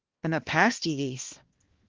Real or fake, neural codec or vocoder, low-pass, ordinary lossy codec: fake; codec, 16 kHz, 1 kbps, X-Codec, HuBERT features, trained on balanced general audio; 7.2 kHz; Opus, 16 kbps